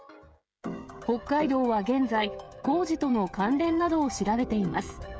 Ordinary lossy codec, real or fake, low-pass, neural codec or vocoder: none; fake; none; codec, 16 kHz, 16 kbps, FreqCodec, smaller model